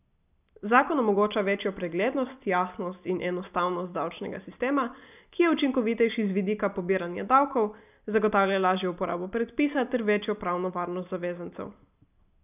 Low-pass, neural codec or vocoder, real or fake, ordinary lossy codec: 3.6 kHz; none; real; none